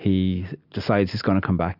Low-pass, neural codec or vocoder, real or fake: 5.4 kHz; none; real